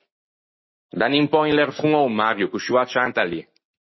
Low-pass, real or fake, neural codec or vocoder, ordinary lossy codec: 7.2 kHz; real; none; MP3, 24 kbps